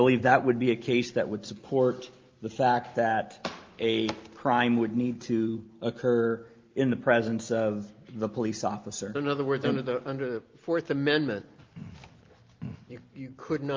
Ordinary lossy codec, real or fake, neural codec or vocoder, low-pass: Opus, 32 kbps; real; none; 7.2 kHz